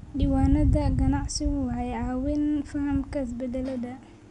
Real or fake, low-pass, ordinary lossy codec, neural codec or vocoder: real; 10.8 kHz; none; none